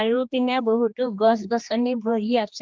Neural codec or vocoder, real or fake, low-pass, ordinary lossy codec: codec, 16 kHz, 2 kbps, X-Codec, HuBERT features, trained on general audio; fake; 7.2 kHz; Opus, 32 kbps